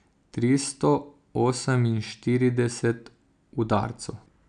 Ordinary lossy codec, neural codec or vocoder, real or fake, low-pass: none; none; real; 9.9 kHz